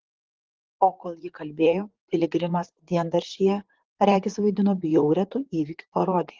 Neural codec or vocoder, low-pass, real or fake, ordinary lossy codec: vocoder, 44.1 kHz, 128 mel bands, Pupu-Vocoder; 7.2 kHz; fake; Opus, 16 kbps